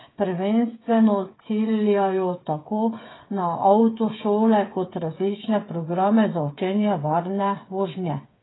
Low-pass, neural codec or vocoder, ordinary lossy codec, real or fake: 7.2 kHz; codec, 16 kHz, 8 kbps, FreqCodec, smaller model; AAC, 16 kbps; fake